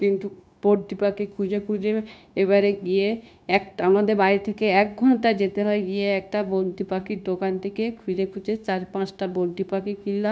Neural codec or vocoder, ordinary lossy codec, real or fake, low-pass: codec, 16 kHz, 0.9 kbps, LongCat-Audio-Codec; none; fake; none